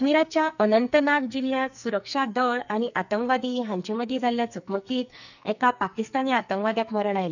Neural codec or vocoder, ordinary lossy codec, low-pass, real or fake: codec, 44.1 kHz, 2.6 kbps, SNAC; none; 7.2 kHz; fake